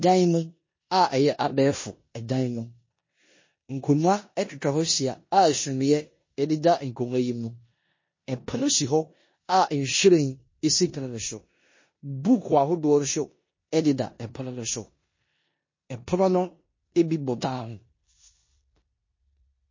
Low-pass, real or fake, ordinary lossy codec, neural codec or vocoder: 7.2 kHz; fake; MP3, 32 kbps; codec, 16 kHz in and 24 kHz out, 0.9 kbps, LongCat-Audio-Codec, four codebook decoder